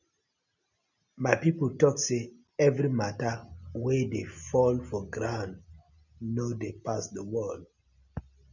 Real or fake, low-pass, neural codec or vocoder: real; 7.2 kHz; none